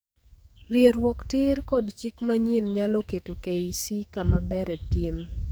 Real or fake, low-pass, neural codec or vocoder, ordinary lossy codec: fake; none; codec, 44.1 kHz, 2.6 kbps, SNAC; none